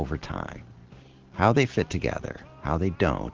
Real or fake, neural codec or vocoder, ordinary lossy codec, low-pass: real; none; Opus, 16 kbps; 7.2 kHz